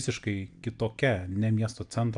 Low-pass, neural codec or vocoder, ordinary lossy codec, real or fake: 9.9 kHz; none; AAC, 64 kbps; real